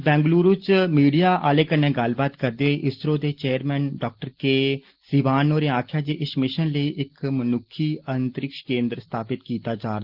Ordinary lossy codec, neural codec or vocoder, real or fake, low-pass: Opus, 16 kbps; none; real; 5.4 kHz